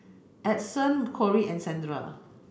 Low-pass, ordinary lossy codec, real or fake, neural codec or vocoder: none; none; real; none